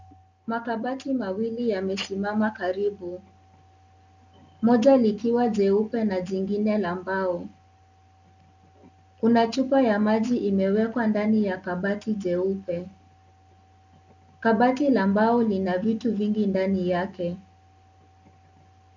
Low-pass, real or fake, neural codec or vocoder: 7.2 kHz; real; none